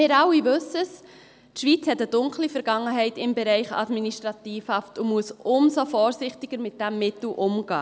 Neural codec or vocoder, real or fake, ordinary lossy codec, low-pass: none; real; none; none